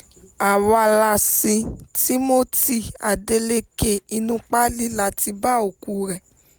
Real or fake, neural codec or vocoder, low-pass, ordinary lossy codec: real; none; none; none